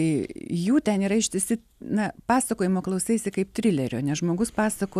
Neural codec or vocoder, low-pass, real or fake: none; 14.4 kHz; real